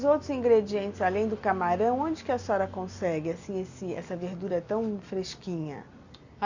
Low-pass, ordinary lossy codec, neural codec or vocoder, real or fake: 7.2 kHz; Opus, 64 kbps; none; real